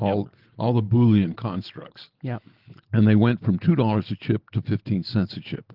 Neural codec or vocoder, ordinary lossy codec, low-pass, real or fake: none; Opus, 16 kbps; 5.4 kHz; real